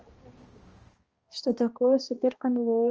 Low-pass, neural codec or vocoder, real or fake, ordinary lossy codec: 7.2 kHz; codec, 16 kHz, 1 kbps, X-Codec, HuBERT features, trained on balanced general audio; fake; Opus, 24 kbps